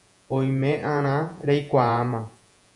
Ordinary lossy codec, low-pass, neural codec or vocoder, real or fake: MP3, 96 kbps; 10.8 kHz; vocoder, 48 kHz, 128 mel bands, Vocos; fake